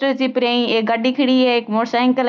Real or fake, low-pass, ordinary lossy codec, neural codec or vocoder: real; none; none; none